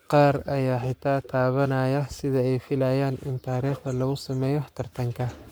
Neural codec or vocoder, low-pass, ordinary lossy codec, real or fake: codec, 44.1 kHz, 7.8 kbps, Pupu-Codec; none; none; fake